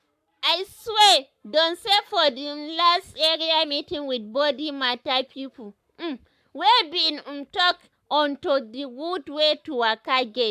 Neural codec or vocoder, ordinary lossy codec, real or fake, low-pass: codec, 44.1 kHz, 7.8 kbps, Pupu-Codec; AAC, 96 kbps; fake; 14.4 kHz